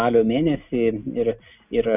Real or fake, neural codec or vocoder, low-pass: real; none; 3.6 kHz